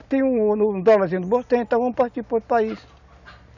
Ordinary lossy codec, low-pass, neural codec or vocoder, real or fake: none; 7.2 kHz; none; real